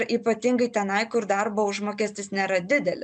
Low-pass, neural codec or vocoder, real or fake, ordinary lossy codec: 9.9 kHz; none; real; MP3, 96 kbps